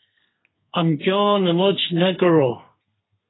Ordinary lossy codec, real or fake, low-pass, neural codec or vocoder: AAC, 16 kbps; fake; 7.2 kHz; codec, 32 kHz, 1.9 kbps, SNAC